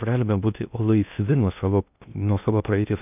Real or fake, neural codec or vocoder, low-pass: fake; codec, 16 kHz in and 24 kHz out, 0.6 kbps, FocalCodec, streaming, 2048 codes; 3.6 kHz